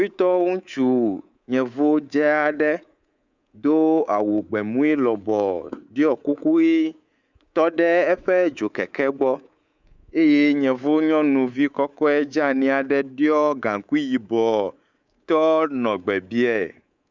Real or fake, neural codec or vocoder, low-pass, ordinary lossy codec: fake; codec, 24 kHz, 3.1 kbps, DualCodec; 7.2 kHz; Opus, 64 kbps